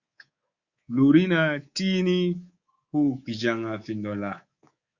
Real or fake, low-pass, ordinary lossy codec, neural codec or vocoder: fake; 7.2 kHz; Opus, 64 kbps; codec, 24 kHz, 3.1 kbps, DualCodec